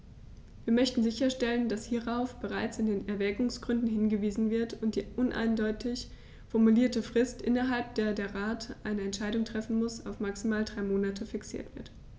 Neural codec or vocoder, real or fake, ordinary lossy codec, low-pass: none; real; none; none